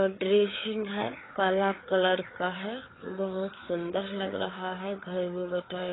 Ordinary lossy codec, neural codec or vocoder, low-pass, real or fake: AAC, 16 kbps; codec, 16 kHz, 4 kbps, FreqCodec, larger model; 7.2 kHz; fake